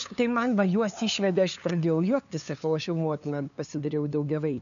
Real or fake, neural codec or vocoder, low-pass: fake; codec, 16 kHz, 2 kbps, FunCodec, trained on LibriTTS, 25 frames a second; 7.2 kHz